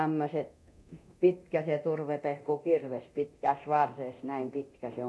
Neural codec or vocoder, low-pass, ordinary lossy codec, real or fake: codec, 24 kHz, 0.9 kbps, DualCodec; none; none; fake